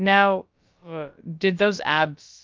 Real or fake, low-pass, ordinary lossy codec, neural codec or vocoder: fake; 7.2 kHz; Opus, 24 kbps; codec, 16 kHz, about 1 kbps, DyCAST, with the encoder's durations